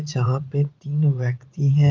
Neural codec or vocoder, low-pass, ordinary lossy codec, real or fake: vocoder, 44.1 kHz, 80 mel bands, Vocos; 7.2 kHz; Opus, 24 kbps; fake